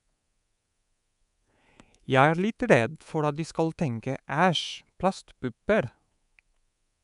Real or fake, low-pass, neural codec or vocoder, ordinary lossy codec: fake; 10.8 kHz; codec, 24 kHz, 3.1 kbps, DualCodec; none